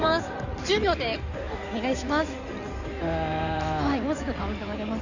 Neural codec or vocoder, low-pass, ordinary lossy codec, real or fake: codec, 16 kHz in and 24 kHz out, 2.2 kbps, FireRedTTS-2 codec; 7.2 kHz; none; fake